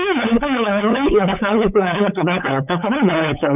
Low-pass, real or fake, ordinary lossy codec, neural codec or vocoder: 3.6 kHz; fake; none; codec, 16 kHz, 8 kbps, FunCodec, trained on LibriTTS, 25 frames a second